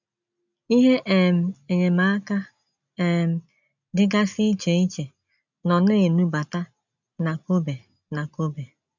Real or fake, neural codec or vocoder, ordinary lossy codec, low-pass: real; none; none; 7.2 kHz